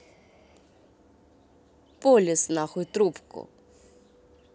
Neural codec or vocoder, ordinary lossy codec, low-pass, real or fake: none; none; none; real